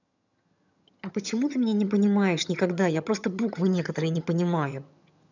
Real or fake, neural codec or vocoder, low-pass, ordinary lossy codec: fake; vocoder, 22.05 kHz, 80 mel bands, HiFi-GAN; 7.2 kHz; none